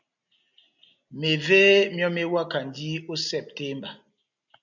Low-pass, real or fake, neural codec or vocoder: 7.2 kHz; real; none